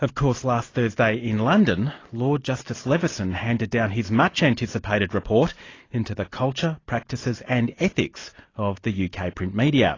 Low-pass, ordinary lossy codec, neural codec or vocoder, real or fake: 7.2 kHz; AAC, 32 kbps; none; real